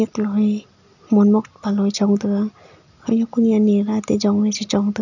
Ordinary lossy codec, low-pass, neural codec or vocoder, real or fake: none; 7.2 kHz; none; real